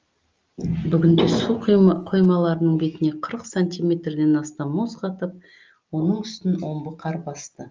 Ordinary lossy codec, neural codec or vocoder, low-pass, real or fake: Opus, 24 kbps; none; 7.2 kHz; real